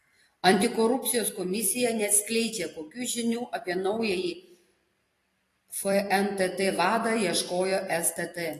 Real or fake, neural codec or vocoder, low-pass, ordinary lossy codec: fake; vocoder, 44.1 kHz, 128 mel bands every 256 samples, BigVGAN v2; 14.4 kHz; AAC, 48 kbps